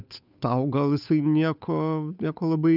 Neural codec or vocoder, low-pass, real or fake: codec, 16 kHz, 8 kbps, FunCodec, trained on Chinese and English, 25 frames a second; 5.4 kHz; fake